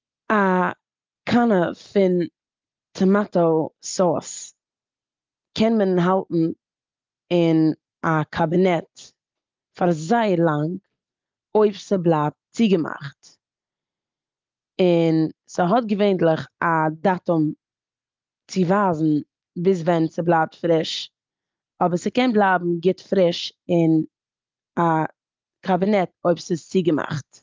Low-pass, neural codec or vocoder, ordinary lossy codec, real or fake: 7.2 kHz; none; Opus, 32 kbps; real